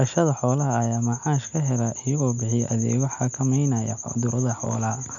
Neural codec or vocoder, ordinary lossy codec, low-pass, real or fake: none; none; 7.2 kHz; real